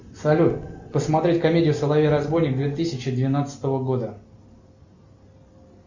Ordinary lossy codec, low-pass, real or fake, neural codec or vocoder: Opus, 64 kbps; 7.2 kHz; real; none